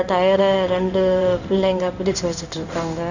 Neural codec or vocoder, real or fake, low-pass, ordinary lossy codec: codec, 16 kHz in and 24 kHz out, 1 kbps, XY-Tokenizer; fake; 7.2 kHz; none